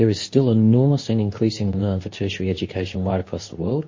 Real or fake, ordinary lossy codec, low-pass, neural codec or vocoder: fake; MP3, 32 kbps; 7.2 kHz; codec, 24 kHz, 0.9 kbps, WavTokenizer, medium speech release version 2